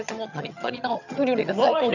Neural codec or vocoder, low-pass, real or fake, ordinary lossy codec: vocoder, 22.05 kHz, 80 mel bands, HiFi-GAN; 7.2 kHz; fake; none